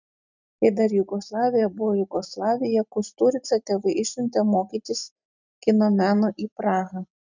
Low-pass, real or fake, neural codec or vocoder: 7.2 kHz; real; none